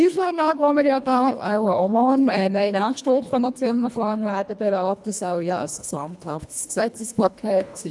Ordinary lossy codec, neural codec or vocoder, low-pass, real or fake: none; codec, 24 kHz, 1.5 kbps, HILCodec; none; fake